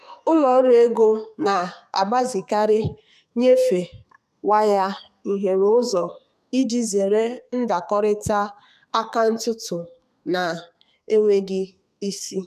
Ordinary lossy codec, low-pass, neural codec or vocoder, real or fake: none; 14.4 kHz; codec, 32 kHz, 1.9 kbps, SNAC; fake